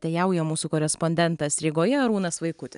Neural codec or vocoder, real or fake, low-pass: none; real; 10.8 kHz